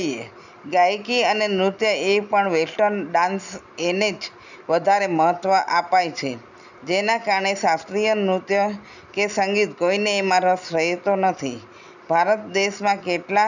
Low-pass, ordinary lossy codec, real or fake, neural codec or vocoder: 7.2 kHz; none; real; none